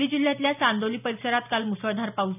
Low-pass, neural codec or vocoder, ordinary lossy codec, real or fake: 3.6 kHz; none; none; real